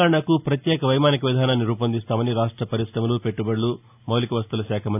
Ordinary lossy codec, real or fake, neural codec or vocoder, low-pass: AAC, 32 kbps; real; none; 3.6 kHz